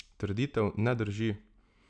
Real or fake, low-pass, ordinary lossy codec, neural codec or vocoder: real; 9.9 kHz; none; none